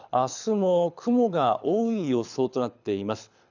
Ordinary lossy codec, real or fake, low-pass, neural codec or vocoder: none; fake; 7.2 kHz; codec, 24 kHz, 6 kbps, HILCodec